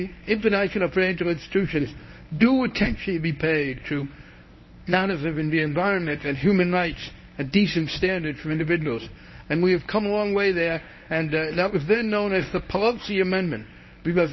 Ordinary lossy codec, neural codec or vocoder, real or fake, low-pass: MP3, 24 kbps; codec, 24 kHz, 0.9 kbps, WavTokenizer, medium speech release version 1; fake; 7.2 kHz